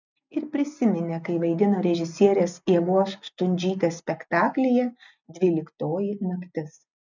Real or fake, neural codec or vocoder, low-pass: real; none; 7.2 kHz